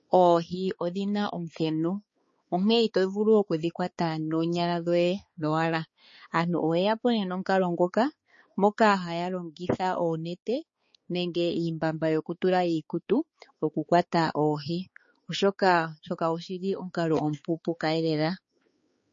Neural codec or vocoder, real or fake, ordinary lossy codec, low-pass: codec, 16 kHz, 4 kbps, X-Codec, HuBERT features, trained on balanced general audio; fake; MP3, 32 kbps; 7.2 kHz